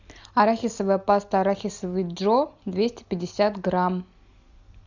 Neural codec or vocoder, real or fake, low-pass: none; real; 7.2 kHz